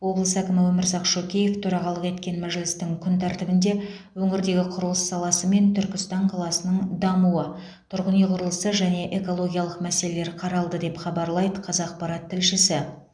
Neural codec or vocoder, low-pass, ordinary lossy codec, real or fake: none; 9.9 kHz; none; real